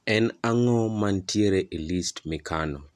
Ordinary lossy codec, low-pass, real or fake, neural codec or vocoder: none; 14.4 kHz; real; none